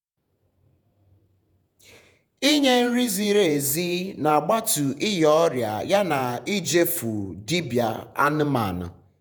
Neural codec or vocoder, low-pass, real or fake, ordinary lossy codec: vocoder, 48 kHz, 128 mel bands, Vocos; none; fake; none